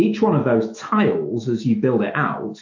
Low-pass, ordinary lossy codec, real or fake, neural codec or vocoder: 7.2 kHz; MP3, 48 kbps; fake; autoencoder, 48 kHz, 128 numbers a frame, DAC-VAE, trained on Japanese speech